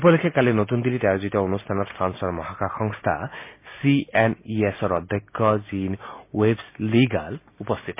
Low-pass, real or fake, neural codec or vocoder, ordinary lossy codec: 3.6 kHz; real; none; MP3, 24 kbps